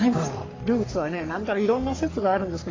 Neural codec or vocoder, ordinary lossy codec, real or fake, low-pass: codec, 44.1 kHz, 3.4 kbps, Pupu-Codec; AAC, 32 kbps; fake; 7.2 kHz